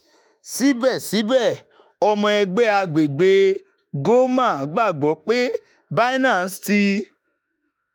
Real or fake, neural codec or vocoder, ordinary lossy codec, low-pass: fake; autoencoder, 48 kHz, 32 numbers a frame, DAC-VAE, trained on Japanese speech; none; none